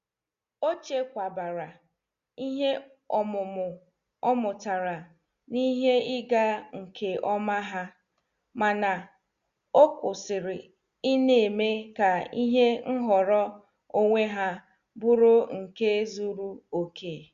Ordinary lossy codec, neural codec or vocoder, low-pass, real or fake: Opus, 64 kbps; none; 7.2 kHz; real